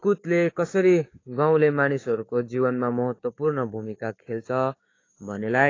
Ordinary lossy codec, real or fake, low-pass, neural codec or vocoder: AAC, 32 kbps; fake; 7.2 kHz; codec, 16 kHz, 6 kbps, DAC